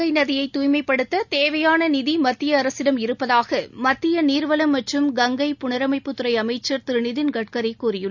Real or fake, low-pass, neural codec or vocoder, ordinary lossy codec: real; 7.2 kHz; none; none